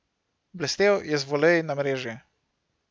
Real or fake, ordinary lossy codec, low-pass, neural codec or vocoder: real; none; none; none